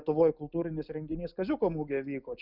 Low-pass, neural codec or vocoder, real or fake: 5.4 kHz; none; real